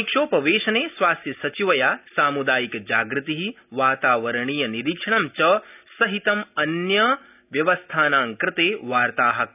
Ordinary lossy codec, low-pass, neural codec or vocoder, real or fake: none; 3.6 kHz; none; real